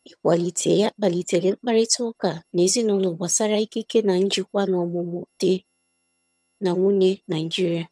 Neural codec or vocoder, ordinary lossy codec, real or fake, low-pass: vocoder, 22.05 kHz, 80 mel bands, HiFi-GAN; none; fake; none